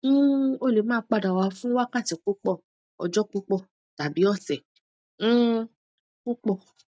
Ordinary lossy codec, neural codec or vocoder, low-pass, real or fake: none; none; none; real